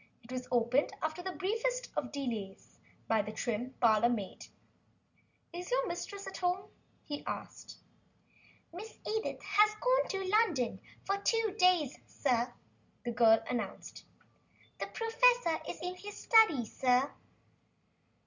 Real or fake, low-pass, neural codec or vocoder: real; 7.2 kHz; none